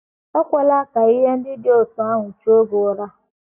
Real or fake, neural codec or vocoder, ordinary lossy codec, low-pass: real; none; none; 3.6 kHz